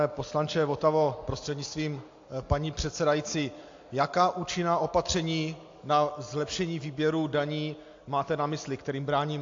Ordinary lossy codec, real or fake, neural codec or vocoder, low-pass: AAC, 48 kbps; real; none; 7.2 kHz